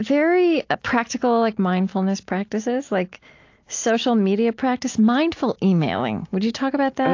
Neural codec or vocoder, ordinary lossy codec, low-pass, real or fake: none; AAC, 48 kbps; 7.2 kHz; real